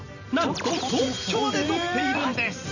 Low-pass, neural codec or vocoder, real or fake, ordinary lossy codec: 7.2 kHz; vocoder, 44.1 kHz, 128 mel bands every 512 samples, BigVGAN v2; fake; none